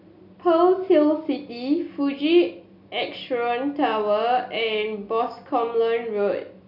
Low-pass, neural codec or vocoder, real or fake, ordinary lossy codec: 5.4 kHz; none; real; none